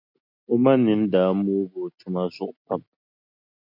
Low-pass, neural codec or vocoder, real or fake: 5.4 kHz; none; real